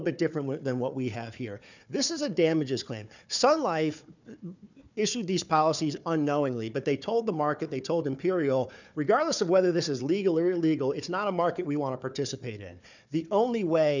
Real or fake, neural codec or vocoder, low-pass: fake; codec, 16 kHz, 4 kbps, FunCodec, trained on Chinese and English, 50 frames a second; 7.2 kHz